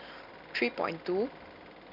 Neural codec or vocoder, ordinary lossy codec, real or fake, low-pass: none; none; real; 5.4 kHz